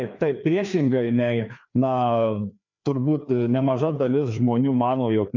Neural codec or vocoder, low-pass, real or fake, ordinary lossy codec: codec, 16 kHz, 2 kbps, FreqCodec, larger model; 7.2 kHz; fake; MP3, 64 kbps